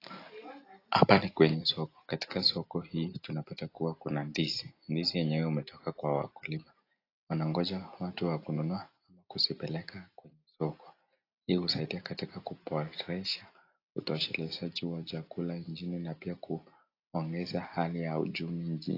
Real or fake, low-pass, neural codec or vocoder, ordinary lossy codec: real; 5.4 kHz; none; AAC, 32 kbps